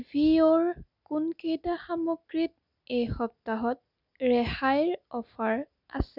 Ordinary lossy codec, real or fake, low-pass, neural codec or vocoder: none; real; 5.4 kHz; none